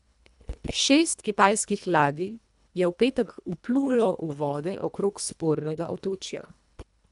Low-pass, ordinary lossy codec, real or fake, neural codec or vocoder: 10.8 kHz; none; fake; codec, 24 kHz, 1.5 kbps, HILCodec